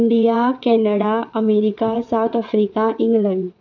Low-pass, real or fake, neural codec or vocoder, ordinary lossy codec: 7.2 kHz; fake; vocoder, 22.05 kHz, 80 mel bands, WaveNeXt; none